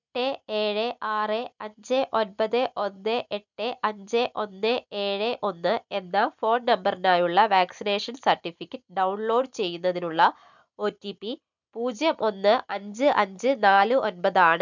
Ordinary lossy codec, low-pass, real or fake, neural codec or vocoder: none; 7.2 kHz; real; none